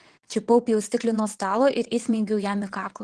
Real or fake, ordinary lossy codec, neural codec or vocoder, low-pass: fake; Opus, 16 kbps; vocoder, 22.05 kHz, 80 mel bands, WaveNeXt; 9.9 kHz